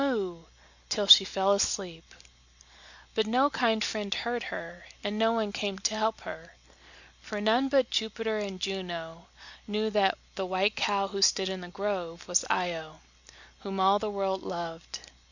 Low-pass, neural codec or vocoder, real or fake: 7.2 kHz; none; real